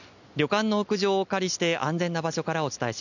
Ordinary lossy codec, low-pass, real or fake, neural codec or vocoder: none; 7.2 kHz; real; none